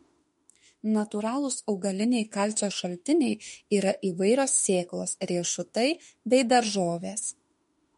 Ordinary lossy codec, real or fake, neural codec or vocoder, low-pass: MP3, 48 kbps; fake; autoencoder, 48 kHz, 32 numbers a frame, DAC-VAE, trained on Japanese speech; 19.8 kHz